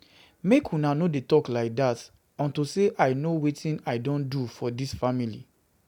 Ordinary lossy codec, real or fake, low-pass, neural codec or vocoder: none; real; 19.8 kHz; none